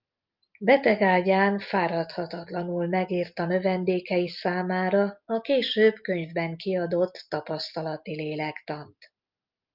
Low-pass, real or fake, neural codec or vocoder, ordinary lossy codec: 5.4 kHz; real; none; Opus, 24 kbps